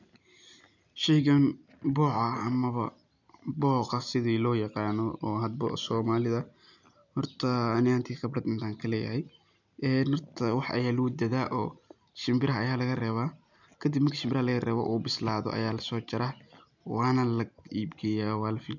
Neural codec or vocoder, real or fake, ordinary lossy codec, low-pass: none; real; none; 7.2 kHz